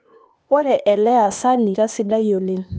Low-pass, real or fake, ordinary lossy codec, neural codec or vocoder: none; fake; none; codec, 16 kHz, 0.8 kbps, ZipCodec